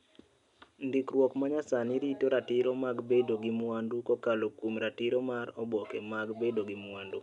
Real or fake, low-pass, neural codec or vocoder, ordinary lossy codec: real; 10.8 kHz; none; none